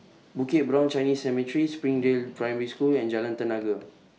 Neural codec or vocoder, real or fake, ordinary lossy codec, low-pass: none; real; none; none